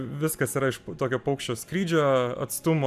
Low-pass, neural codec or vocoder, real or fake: 14.4 kHz; none; real